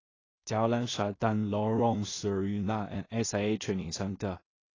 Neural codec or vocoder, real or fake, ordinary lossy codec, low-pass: codec, 16 kHz in and 24 kHz out, 0.4 kbps, LongCat-Audio-Codec, two codebook decoder; fake; AAC, 32 kbps; 7.2 kHz